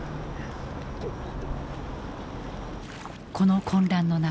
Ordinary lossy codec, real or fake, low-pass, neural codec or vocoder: none; real; none; none